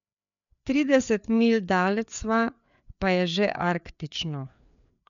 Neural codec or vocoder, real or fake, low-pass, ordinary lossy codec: codec, 16 kHz, 4 kbps, FreqCodec, larger model; fake; 7.2 kHz; none